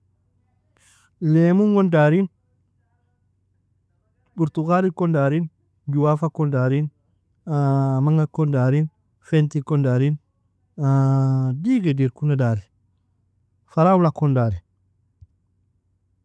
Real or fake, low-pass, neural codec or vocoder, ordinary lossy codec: real; none; none; none